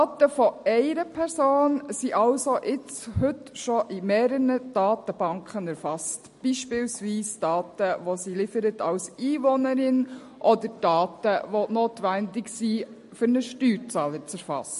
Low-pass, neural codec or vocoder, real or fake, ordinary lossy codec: 14.4 kHz; none; real; MP3, 48 kbps